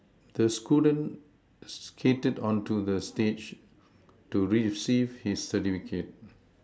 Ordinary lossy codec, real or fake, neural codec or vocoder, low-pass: none; real; none; none